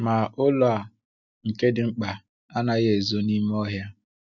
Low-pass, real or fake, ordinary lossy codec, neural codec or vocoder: 7.2 kHz; real; none; none